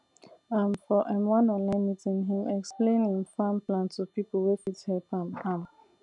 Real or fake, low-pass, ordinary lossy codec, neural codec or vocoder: real; 10.8 kHz; none; none